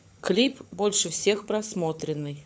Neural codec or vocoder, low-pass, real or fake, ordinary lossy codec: codec, 16 kHz, 16 kbps, FunCodec, trained on LibriTTS, 50 frames a second; none; fake; none